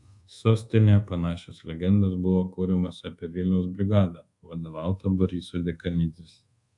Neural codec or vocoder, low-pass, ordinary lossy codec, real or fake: codec, 24 kHz, 1.2 kbps, DualCodec; 10.8 kHz; AAC, 64 kbps; fake